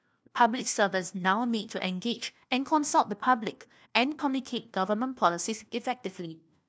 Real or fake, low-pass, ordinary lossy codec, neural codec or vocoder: fake; none; none; codec, 16 kHz, 1 kbps, FunCodec, trained on LibriTTS, 50 frames a second